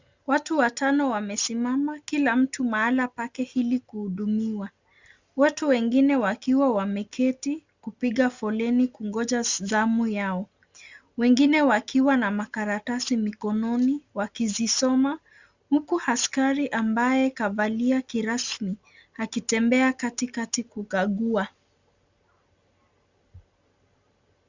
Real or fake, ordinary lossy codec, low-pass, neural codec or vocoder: real; Opus, 64 kbps; 7.2 kHz; none